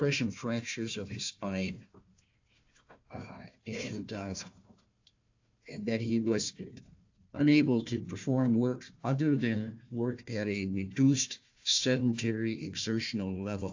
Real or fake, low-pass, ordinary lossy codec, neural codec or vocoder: fake; 7.2 kHz; AAC, 48 kbps; codec, 16 kHz, 1 kbps, FunCodec, trained on Chinese and English, 50 frames a second